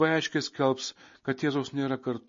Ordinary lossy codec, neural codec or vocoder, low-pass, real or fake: MP3, 32 kbps; none; 7.2 kHz; real